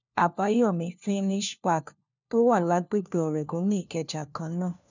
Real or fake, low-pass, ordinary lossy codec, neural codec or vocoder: fake; 7.2 kHz; none; codec, 16 kHz, 1 kbps, FunCodec, trained on LibriTTS, 50 frames a second